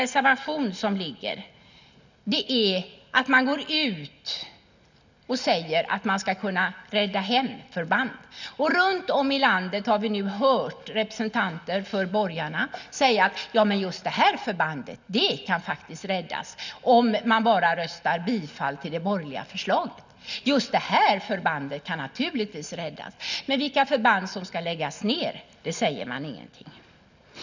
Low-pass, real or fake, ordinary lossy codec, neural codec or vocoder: 7.2 kHz; real; none; none